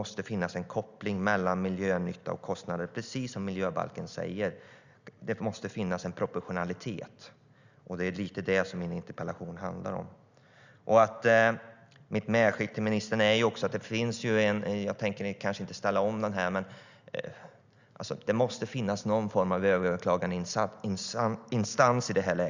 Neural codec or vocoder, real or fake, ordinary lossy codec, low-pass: none; real; Opus, 64 kbps; 7.2 kHz